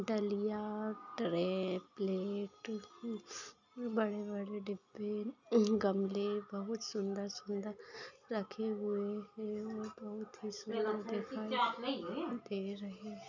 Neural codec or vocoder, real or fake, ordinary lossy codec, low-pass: none; real; none; 7.2 kHz